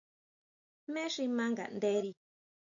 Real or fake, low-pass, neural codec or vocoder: real; 7.2 kHz; none